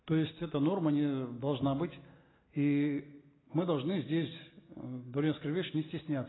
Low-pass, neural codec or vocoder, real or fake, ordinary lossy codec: 7.2 kHz; none; real; AAC, 16 kbps